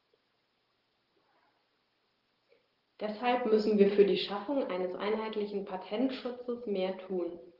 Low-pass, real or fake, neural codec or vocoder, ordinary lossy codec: 5.4 kHz; real; none; Opus, 16 kbps